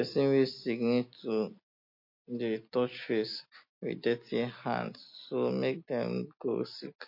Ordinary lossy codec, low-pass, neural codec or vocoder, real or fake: MP3, 32 kbps; 5.4 kHz; none; real